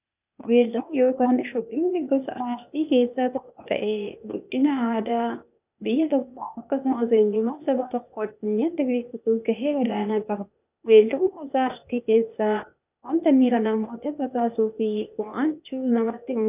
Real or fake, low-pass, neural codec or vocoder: fake; 3.6 kHz; codec, 16 kHz, 0.8 kbps, ZipCodec